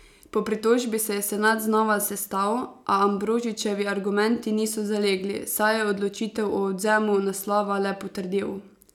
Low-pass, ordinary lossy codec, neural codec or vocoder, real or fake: 19.8 kHz; none; none; real